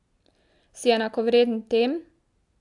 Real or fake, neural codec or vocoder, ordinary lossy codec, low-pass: real; none; AAC, 64 kbps; 10.8 kHz